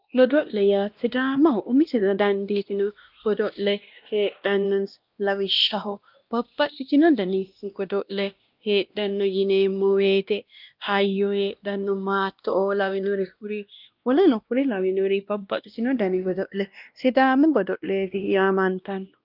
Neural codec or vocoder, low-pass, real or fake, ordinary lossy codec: codec, 16 kHz, 1 kbps, X-Codec, WavLM features, trained on Multilingual LibriSpeech; 5.4 kHz; fake; Opus, 24 kbps